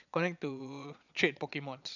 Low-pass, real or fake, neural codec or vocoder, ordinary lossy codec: 7.2 kHz; fake; vocoder, 22.05 kHz, 80 mel bands, Vocos; none